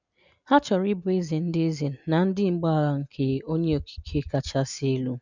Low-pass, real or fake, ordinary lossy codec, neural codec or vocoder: 7.2 kHz; real; none; none